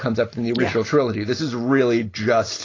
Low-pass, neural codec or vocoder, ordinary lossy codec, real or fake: 7.2 kHz; none; AAC, 32 kbps; real